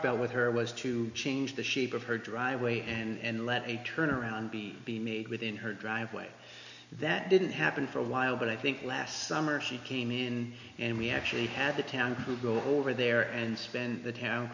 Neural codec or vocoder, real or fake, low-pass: none; real; 7.2 kHz